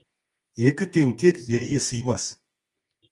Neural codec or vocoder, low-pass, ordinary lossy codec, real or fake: codec, 24 kHz, 0.9 kbps, WavTokenizer, medium music audio release; 10.8 kHz; Opus, 24 kbps; fake